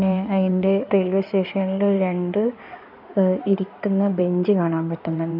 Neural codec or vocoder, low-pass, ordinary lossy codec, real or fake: codec, 16 kHz in and 24 kHz out, 2.2 kbps, FireRedTTS-2 codec; 5.4 kHz; none; fake